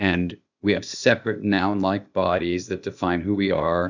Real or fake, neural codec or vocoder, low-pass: fake; codec, 16 kHz, 0.8 kbps, ZipCodec; 7.2 kHz